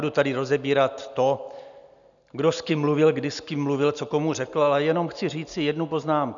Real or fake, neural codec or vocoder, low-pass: real; none; 7.2 kHz